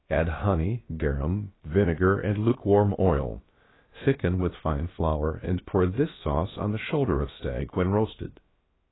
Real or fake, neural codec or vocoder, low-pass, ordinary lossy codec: fake; codec, 16 kHz, about 1 kbps, DyCAST, with the encoder's durations; 7.2 kHz; AAC, 16 kbps